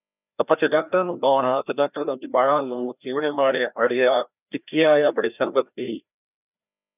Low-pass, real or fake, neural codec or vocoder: 3.6 kHz; fake; codec, 16 kHz, 1 kbps, FreqCodec, larger model